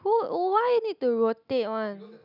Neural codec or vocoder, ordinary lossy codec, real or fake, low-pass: none; none; real; 5.4 kHz